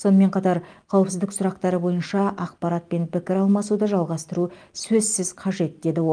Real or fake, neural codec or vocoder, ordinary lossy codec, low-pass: real; none; Opus, 32 kbps; 9.9 kHz